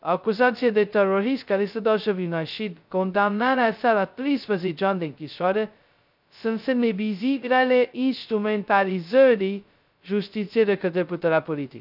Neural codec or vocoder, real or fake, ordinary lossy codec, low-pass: codec, 16 kHz, 0.2 kbps, FocalCodec; fake; none; 5.4 kHz